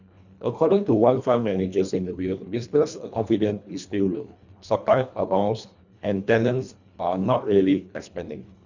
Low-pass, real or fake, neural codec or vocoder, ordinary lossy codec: 7.2 kHz; fake; codec, 24 kHz, 1.5 kbps, HILCodec; none